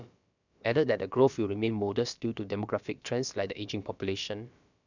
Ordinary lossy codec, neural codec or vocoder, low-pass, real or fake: none; codec, 16 kHz, about 1 kbps, DyCAST, with the encoder's durations; 7.2 kHz; fake